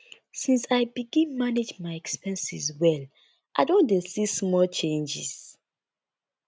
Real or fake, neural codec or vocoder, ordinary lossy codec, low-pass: real; none; none; none